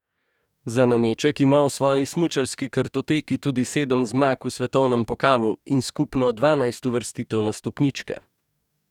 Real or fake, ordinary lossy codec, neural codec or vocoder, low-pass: fake; none; codec, 44.1 kHz, 2.6 kbps, DAC; 19.8 kHz